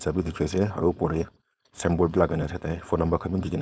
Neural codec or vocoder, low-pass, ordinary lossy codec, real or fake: codec, 16 kHz, 4.8 kbps, FACodec; none; none; fake